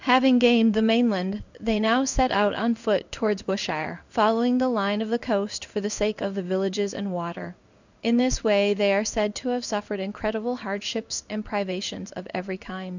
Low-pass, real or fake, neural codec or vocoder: 7.2 kHz; fake; codec, 16 kHz in and 24 kHz out, 1 kbps, XY-Tokenizer